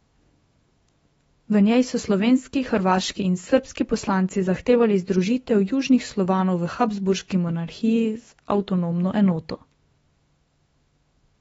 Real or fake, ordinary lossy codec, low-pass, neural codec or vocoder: fake; AAC, 24 kbps; 19.8 kHz; autoencoder, 48 kHz, 128 numbers a frame, DAC-VAE, trained on Japanese speech